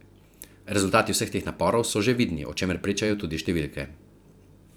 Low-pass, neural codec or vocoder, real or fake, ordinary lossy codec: none; vocoder, 44.1 kHz, 128 mel bands every 512 samples, BigVGAN v2; fake; none